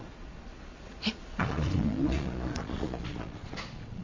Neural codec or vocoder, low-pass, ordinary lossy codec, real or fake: vocoder, 22.05 kHz, 80 mel bands, Vocos; 7.2 kHz; MP3, 48 kbps; fake